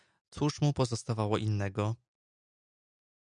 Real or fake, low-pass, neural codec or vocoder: real; 9.9 kHz; none